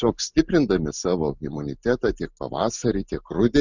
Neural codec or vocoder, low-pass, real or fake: none; 7.2 kHz; real